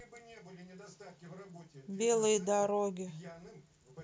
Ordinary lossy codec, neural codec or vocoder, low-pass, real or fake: none; none; none; real